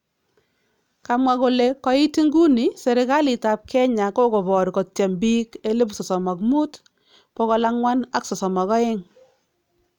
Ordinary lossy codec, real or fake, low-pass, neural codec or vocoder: none; real; 19.8 kHz; none